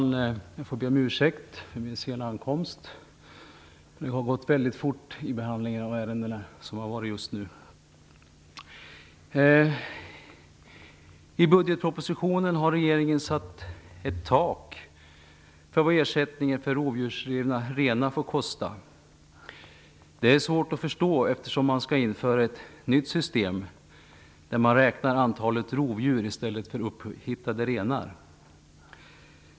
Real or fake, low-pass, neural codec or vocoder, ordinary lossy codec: real; none; none; none